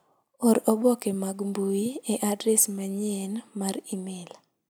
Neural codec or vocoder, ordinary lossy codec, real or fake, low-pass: none; none; real; none